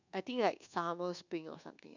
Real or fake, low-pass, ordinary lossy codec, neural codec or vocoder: fake; 7.2 kHz; none; codec, 24 kHz, 1.2 kbps, DualCodec